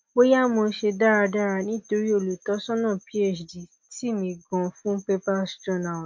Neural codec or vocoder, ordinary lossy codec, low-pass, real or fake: none; MP3, 48 kbps; 7.2 kHz; real